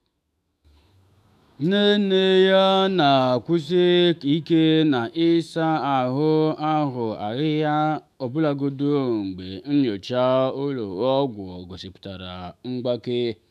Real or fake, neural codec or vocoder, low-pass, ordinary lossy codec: fake; autoencoder, 48 kHz, 128 numbers a frame, DAC-VAE, trained on Japanese speech; 14.4 kHz; none